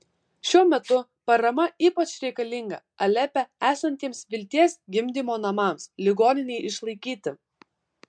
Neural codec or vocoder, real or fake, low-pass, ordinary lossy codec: none; real; 9.9 kHz; MP3, 64 kbps